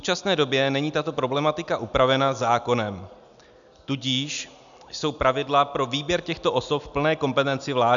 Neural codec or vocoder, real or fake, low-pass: none; real; 7.2 kHz